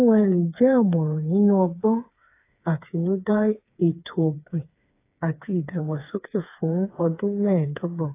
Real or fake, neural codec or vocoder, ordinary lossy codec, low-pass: fake; codec, 16 kHz, 4 kbps, FreqCodec, smaller model; AAC, 24 kbps; 3.6 kHz